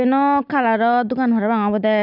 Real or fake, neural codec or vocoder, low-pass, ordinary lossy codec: real; none; 5.4 kHz; none